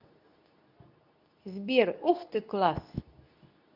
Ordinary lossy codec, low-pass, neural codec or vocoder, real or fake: none; 5.4 kHz; codec, 24 kHz, 0.9 kbps, WavTokenizer, medium speech release version 2; fake